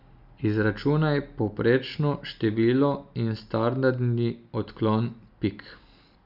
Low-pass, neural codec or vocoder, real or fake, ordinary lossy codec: 5.4 kHz; none; real; Opus, 64 kbps